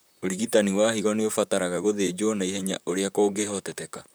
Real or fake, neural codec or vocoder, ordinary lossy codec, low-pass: fake; vocoder, 44.1 kHz, 128 mel bands, Pupu-Vocoder; none; none